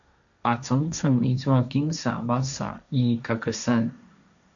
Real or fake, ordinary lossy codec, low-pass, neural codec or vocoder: fake; MP3, 96 kbps; 7.2 kHz; codec, 16 kHz, 1.1 kbps, Voila-Tokenizer